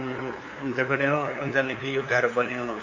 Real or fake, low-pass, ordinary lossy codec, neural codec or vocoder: fake; 7.2 kHz; AAC, 48 kbps; codec, 16 kHz, 2 kbps, FunCodec, trained on LibriTTS, 25 frames a second